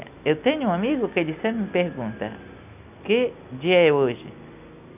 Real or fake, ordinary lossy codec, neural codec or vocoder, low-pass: real; none; none; 3.6 kHz